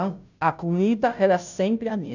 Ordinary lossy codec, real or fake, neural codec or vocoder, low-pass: none; fake; codec, 16 kHz, 0.5 kbps, FunCodec, trained on Chinese and English, 25 frames a second; 7.2 kHz